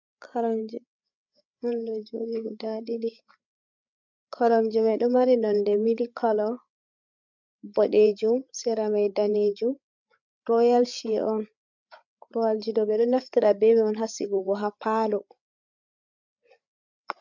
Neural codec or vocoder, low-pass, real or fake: codec, 16 kHz, 8 kbps, FreqCodec, larger model; 7.2 kHz; fake